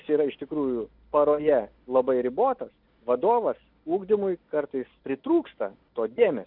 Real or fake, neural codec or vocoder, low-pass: real; none; 5.4 kHz